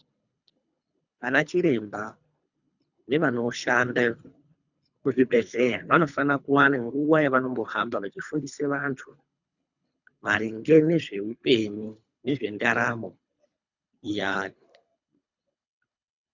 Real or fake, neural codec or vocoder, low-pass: fake; codec, 24 kHz, 3 kbps, HILCodec; 7.2 kHz